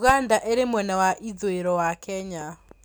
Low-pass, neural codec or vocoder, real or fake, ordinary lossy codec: none; none; real; none